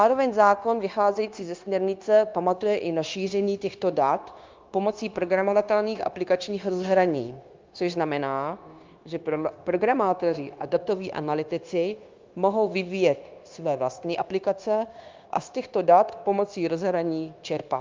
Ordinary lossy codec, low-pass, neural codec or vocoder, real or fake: Opus, 32 kbps; 7.2 kHz; codec, 16 kHz, 0.9 kbps, LongCat-Audio-Codec; fake